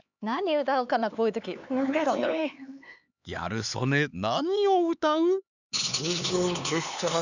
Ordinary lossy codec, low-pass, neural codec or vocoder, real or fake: none; 7.2 kHz; codec, 16 kHz, 4 kbps, X-Codec, HuBERT features, trained on LibriSpeech; fake